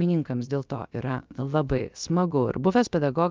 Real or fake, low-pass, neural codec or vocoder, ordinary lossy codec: fake; 7.2 kHz; codec, 16 kHz, about 1 kbps, DyCAST, with the encoder's durations; Opus, 24 kbps